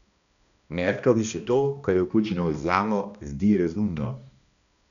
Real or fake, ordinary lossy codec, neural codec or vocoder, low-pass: fake; none; codec, 16 kHz, 1 kbps, X-Codec, HuBERT features, trained on balanced general audio; 7.2 kHz